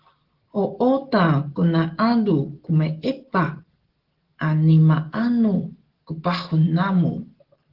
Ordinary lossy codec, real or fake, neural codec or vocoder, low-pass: Opus, 16 kbps; real; none; 5.4 kHz